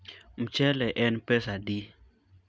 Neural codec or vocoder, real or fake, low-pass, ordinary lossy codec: none; real; none; none